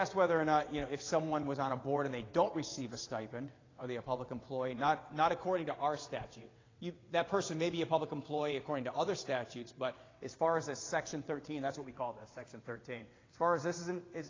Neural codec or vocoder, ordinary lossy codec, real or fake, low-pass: vocoder, 22.05 kHz, 80 mel bands, WaveNeXt; AAC, 32 kbps; fake; 7.2 kHz